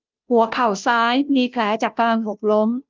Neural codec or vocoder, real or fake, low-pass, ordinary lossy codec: codec, 16 kHz, 0.5 kbps, FunCodec, trained on Chinese and English, 25 frames a second; fake; 7.2 kHz; Opus, 24 kbps